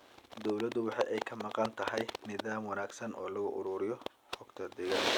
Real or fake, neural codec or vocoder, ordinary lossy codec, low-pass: real; none; none; none